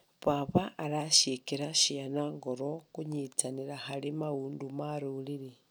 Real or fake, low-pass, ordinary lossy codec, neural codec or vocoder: real; none; none; none